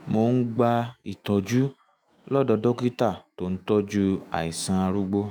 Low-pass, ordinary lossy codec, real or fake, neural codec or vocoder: none; none; fake; autoencoder, 48 kHz, 128 numbers a frame, DAC-VAE, trained on Japanese speech